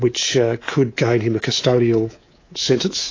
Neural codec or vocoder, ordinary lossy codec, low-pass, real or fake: codec, 24 kHz, 3.1 kbps, DualCodec; AAC, 32 kbps; 7.2 kHz; fake